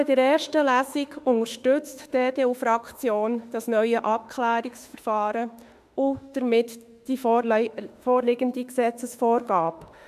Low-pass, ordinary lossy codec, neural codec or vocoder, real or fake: 14.4 kHz; none; autoencoder, 48 kHz, 32 numbers a frame, DAC-VAE, trained on Japanese speech; fake